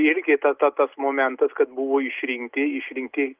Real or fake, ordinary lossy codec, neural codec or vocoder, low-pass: real; Opus, 32 kbps; none; 3.6 kHz